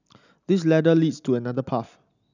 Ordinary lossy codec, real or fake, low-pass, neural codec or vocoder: none; real; 7.2 kHz; none